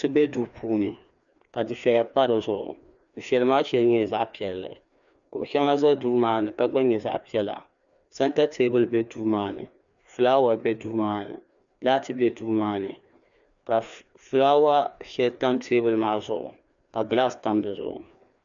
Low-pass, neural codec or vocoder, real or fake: 7.2 kHz; codec, 16 kHz, 2 kbps, FreqCodec, larger model; fake